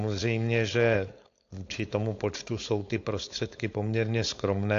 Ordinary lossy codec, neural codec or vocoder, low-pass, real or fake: AAC, 48 kbps; codec, 16 kHz, 4.8 kbps, FACodec; 7.2 kHz; fake